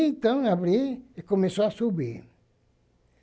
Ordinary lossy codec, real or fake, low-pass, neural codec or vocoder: none; real; none; none